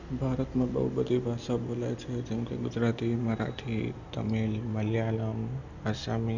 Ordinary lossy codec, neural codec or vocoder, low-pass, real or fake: none; none; 7.2 kHz; real